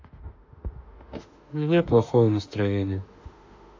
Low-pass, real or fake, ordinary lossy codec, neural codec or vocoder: 7.2 kHz; fake; AAC, 48 kbps; autoencoder, 48 kHz, 32 numbers a frame, DAC-VAE, trained on Japanese speech